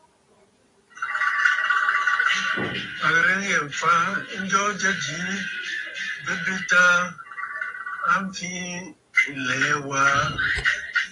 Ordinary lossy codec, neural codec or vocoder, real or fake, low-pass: AAC, 32 kbps; none; real; 10.8 kHz